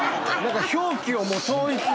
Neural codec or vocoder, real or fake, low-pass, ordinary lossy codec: none; real; none; none